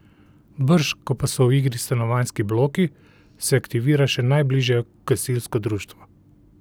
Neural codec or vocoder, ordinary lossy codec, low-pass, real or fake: none; none; none; real